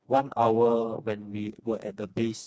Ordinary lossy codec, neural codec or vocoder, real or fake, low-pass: none; codec, 16 kHz, 2 kbps, FreqCodec, smaller model; fake; none